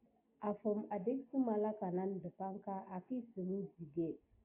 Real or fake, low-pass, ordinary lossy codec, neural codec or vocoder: real; 3.6 kHz; AAC, 24 kbps; none